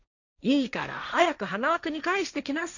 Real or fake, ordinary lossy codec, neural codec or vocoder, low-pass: fake; none; codec, 16 kHz, 1.1 kbps, Voila-Tokenizer; 7.2 kHz